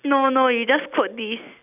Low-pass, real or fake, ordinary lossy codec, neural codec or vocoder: 3.6 kHz; real; none; none